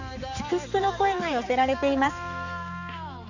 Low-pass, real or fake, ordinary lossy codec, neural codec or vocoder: 7.2 kHz; fake; none; codec, 16 kHz, 4 kbps, X-Codec, HuBERT features, trained on balanced general audio